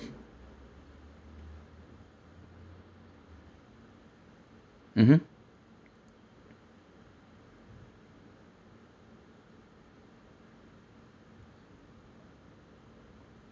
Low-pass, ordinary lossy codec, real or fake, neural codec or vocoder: none; none; real; none